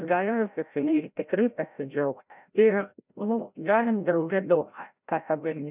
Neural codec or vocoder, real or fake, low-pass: codec, 16 kHz, 0.5 kbps, FreqCodec, larger model; fake; 3.6 kHz